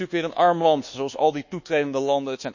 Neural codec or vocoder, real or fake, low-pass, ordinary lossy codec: codec, 24 kHz, 1.2 kbps, DualCodec; fake; 7.2 kHz; none